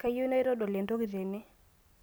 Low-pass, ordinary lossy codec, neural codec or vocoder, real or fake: none; none; none; real